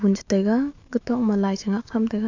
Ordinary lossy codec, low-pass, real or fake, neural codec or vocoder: AAC, 48 kbps; 7.2 kHz; fake; autoencoder, 48 kHz, 128 numbers a frame, DAC-VAE, trained on Japanese speech